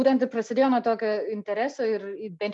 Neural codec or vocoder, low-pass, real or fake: none; 10.8 kHz; real